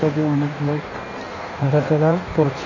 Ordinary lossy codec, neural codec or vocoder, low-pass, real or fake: none; codec, 16 kHz in and 24 kHz out, 1.1 kbps, FireRedTTS-2 codec; 7.2 kHz; fake